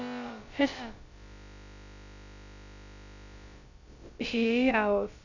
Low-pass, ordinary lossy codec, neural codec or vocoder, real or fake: 7.2 kHz; none; codec, 16 kHz, about 1 kbps, DyCAST, with the encoder's durations; fake